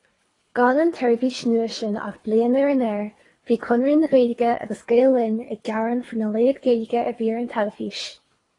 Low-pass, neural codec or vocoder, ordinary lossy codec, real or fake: 10.8 kHz; codec, 24 kHz, 3 kbps, HILCodec; AAC, 32 kbps; fake